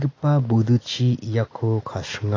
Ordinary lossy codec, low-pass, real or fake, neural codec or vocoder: AAC, 32 kbps; 7.2 kHz; real; none